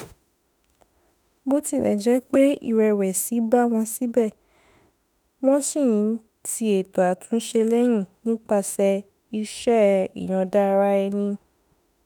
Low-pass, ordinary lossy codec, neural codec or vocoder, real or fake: none; none; autoencoder, 48 kHz, 32 numbers a frame, DAC-VAE, trained on Japanese speech; fake